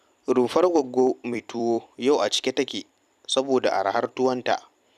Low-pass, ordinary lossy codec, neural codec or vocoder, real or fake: 14.4 kHz; none; vocoder, 44.1 kHz, 128 mel bands every 256 samples, BigVGAN v2; fake